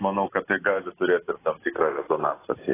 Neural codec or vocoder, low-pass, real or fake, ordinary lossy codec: none; 3.6 kHz; real; AAC, 16 kbps